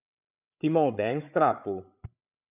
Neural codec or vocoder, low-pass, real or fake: codec, 16 kHz, 8 kbps, FreqCodec, larger model; 3.6 kHz; fake